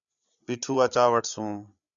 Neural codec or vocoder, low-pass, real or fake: codec, 16 kHz, 8 kbps, FreqCodec, larger model; 7.2 kHz; fake